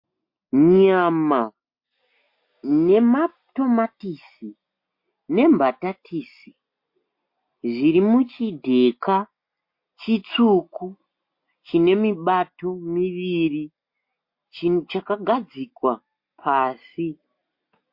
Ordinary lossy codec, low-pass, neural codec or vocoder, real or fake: MP3, 32 kbps; 5.4 kHz; none; real